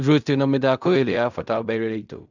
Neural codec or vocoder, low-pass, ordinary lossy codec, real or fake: codec, 16 kHz in and 24 kHz out, 0.4 kbps, LongCat-Audio-Codec, fine tuned four codebook decoder; 7.2 kHz; none; fake